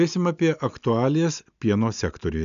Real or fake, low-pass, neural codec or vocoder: real; 7.2 kHz; none